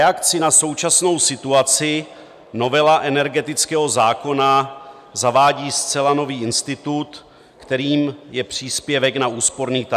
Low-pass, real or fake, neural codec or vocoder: 14.4 kHz; real; none